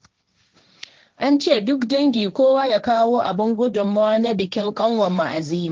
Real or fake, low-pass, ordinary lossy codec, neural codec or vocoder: fake; 7.2 kHz; Opus, 32 kbps; codec, 16 kHz, 1.1 kbps, Voila-Tokenizer